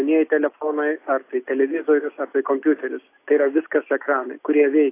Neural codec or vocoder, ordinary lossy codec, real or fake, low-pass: none; AAC, 24 kbps; real; 3.6 kHz